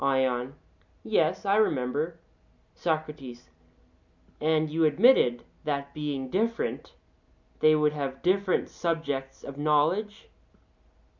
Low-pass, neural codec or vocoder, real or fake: 7.2 kHz; none; real